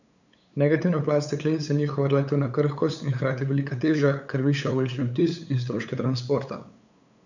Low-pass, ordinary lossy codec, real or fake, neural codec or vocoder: 7.2 kHz; none; fake; codec, 16 kHz, 8 kbps, FunCodec, trained on LibriTTS, 25 frames a second